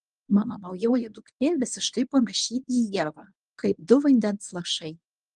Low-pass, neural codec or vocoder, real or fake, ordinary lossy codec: 10.8 kHz; codec, 24 kHz, 0.9 kbps, WavTokenizer, medium speech release version 1; fake; Opus, 32 kbps